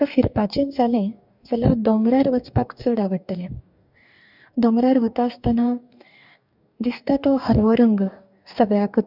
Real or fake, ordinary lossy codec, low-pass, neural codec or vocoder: fake; none; 5.4 kHz; codec, 44.1 kHz, 2.6 kbps, DAC